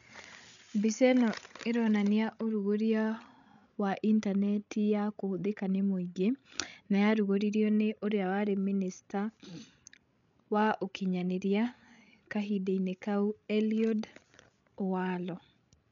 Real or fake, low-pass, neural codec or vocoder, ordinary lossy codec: fake; 7.2 kHz; codec, 16 kHz, 16 kbps, FreqCodec, larger model; none